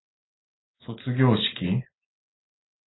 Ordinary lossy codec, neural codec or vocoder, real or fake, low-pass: AAC, 16 kbps; none; real; 7.2 kHz